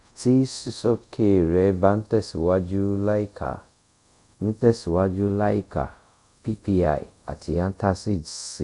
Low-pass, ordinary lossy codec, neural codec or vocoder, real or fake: 10.8 kHz; none; codec, 24 kHz, 0.5 kbps, DualCodec; fake